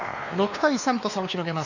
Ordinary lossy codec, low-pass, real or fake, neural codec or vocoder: none; 7.2 kHz; fake; codec, 16 kHz, 2 kbps, X-Codec, WavLM features, trained on Multilingual LibriSpeech